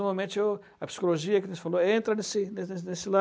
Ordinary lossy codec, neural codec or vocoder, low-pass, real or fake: none; none; none; real